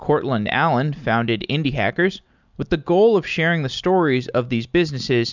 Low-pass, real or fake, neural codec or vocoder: 7.2 kHz; real; none